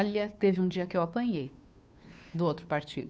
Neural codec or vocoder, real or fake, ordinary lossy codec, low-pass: codec, 16 kHz, 2 kbps, FunCodec, trained on Chinese and English, 25 frames a second; fake; none; none